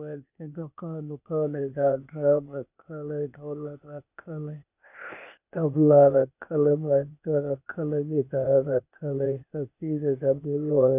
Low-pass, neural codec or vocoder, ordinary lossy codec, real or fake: 3.6 kHz; codec, 16 kHz, 0.8 kbps, ZipCodec; none; fake